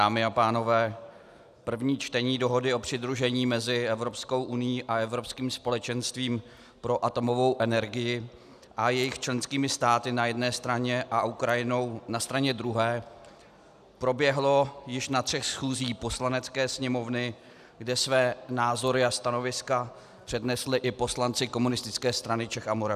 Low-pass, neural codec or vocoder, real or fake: 14.4 kHz; none; real